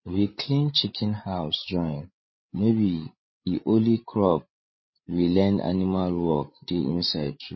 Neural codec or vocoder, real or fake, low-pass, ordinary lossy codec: codec, 16 kHz, 8 kbps, FreqCodec, larger model; fake; 7.2 kHz; MP3, 24 kbps